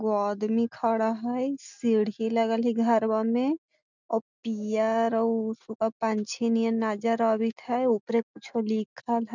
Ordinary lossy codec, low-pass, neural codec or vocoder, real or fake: none; 7.2 kHz; none; real